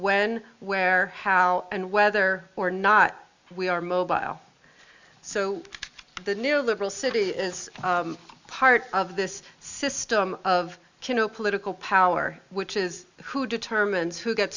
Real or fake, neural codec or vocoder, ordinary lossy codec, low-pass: real; none; Opus, 64 kbps; 7.2 kHz